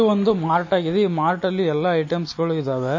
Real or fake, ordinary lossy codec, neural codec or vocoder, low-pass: real; MP3, 32 kbps; none; 7.2 kHz